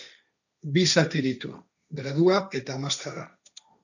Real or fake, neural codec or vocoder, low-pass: fake; codec, 16 kHz, 1.1 kbps, Voila-Tokenizer; 7.2 kHz